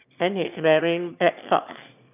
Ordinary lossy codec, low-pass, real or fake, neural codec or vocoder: none; 3.6 kHz; fake; autoencoder, 22.05 kHz, a latent of 192 numbers a frame, VITS, trained on one speaker